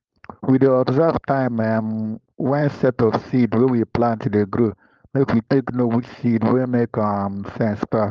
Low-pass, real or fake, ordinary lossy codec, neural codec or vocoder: 7.2 kHz; fake; Opus, 24 kbps; codec, 16 kHz, 4.8 kbps, FACodec